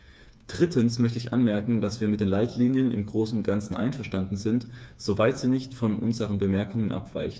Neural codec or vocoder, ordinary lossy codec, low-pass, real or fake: codec, 16 kHz, 4 kbps, FreqCodec, smaller model; none; none; fake